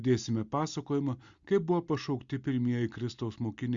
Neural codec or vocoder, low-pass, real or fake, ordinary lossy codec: none; 7.2 kHz; real; Opus, 64 kbps